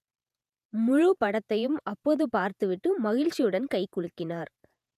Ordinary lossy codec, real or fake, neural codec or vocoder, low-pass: none; fake; vocoder, 48 kHz, 128 mel bands, Vocos; 14.4 kHz